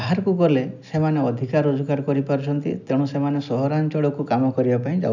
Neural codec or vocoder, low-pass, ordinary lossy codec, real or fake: none; 7.2 kHz; none; real